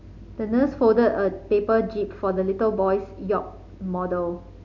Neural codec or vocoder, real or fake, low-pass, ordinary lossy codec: none; real; 7.2 kHz; none